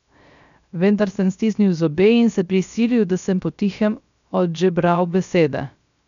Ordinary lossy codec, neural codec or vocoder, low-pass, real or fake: none; codec, 16 kHz, 0.3 kbps, FocalCodec; 7.2 kHz; fake